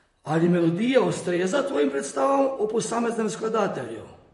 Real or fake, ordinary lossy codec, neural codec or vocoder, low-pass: fake; MP3, 48 kbps; vocoder, 44.1 kHz, 128 mel bands, Pupu-Vocoder; 14.4 kHz